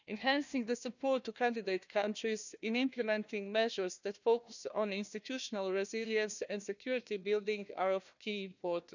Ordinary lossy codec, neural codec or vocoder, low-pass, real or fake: none; codec, 16 kHz, 1 kbps, FunCodec, trained on Chinese and English, 50 frames a second; 7.2 kHz; fake